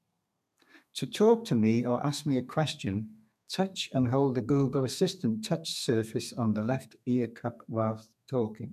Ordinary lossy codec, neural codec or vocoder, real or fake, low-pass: none; codec, 32 kHz, 1.9 kbps, SNAC; fake; 14.4 kHz